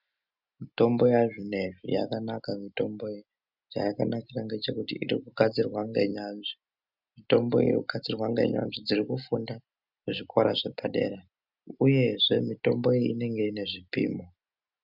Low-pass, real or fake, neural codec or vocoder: 5.4 kHz; real; none